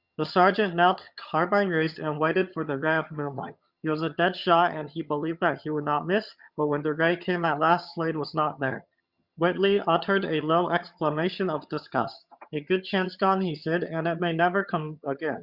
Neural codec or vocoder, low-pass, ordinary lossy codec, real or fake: vocoder, 22.05 kHz, 80 mel bands, HiFi-GAN; 5.4 kHz; Opus, 64 kbps; fake